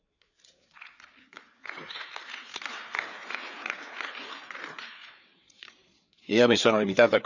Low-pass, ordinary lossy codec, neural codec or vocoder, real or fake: 7.2 kHz; none; codec, 16 kHz, 4 kbps, FreqCodec, larger model; fake